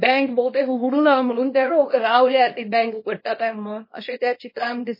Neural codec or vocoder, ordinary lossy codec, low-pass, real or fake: codec, 24 kHz, 0.9 kbps, WavTokenizer, small release; MP3, 24 kbps; 5.4 kHz; fake